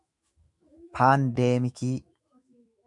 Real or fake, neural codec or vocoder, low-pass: fake; autoencoder, 48 kHz, 128 numbers a frame, DAC-VAE, trained on Japanese speech; 10.8 kHz